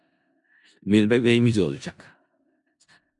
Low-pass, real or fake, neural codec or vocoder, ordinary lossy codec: 10.8 kHz; fake; codec, 16 kHz in and 24 kHz out, 0.4 kbps, LongCat-Audio-Codec, four codebook decoder; MP3, 96 kbps